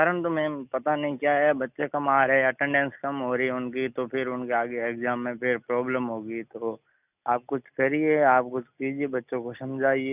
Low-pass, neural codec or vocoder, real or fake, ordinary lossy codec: 3.6 kHz; none; real; none